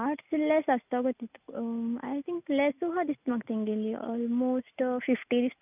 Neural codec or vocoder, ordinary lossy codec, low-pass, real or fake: none; none; 3.6 kHz; real